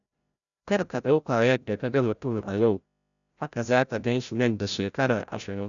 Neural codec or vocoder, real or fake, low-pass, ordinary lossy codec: codec, 16 kHz, 0.5 kbps, FreqCodec, larger model; fake; 7.2 kHz; none